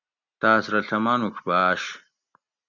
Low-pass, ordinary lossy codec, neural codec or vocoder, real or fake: 7.2 kHz; MP3, 64 kbps; none; real